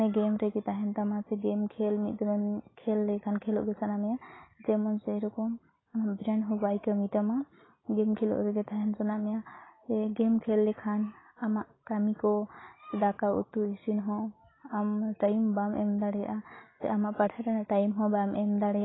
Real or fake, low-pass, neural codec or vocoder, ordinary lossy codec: real; 7.2 kHz; none; AAC, 16 kbps